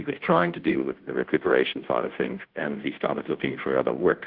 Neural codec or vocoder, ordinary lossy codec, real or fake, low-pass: codec, 16 kHz, 0.5 kbps, FunCodec, trained on Chinese and English, 25 frames a second; Opus, 16 kbps; fake; 5.4 kHz